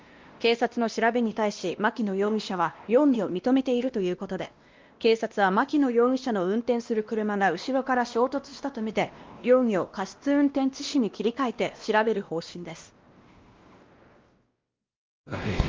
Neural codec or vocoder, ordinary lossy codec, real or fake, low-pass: codec, 16 kHz, 1 kbps, X-Codec, WavLM features, trained on Multilingual LibriSpeech; Opus, 32 kbps; fake; 7.2 kHz